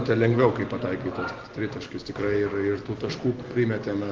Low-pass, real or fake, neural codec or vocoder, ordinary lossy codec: 7.2 kHz; real; none; Opus, 16 kbps